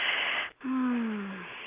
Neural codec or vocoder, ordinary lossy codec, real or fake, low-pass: none; Opus, 32 kbps; real; 3.6 kHz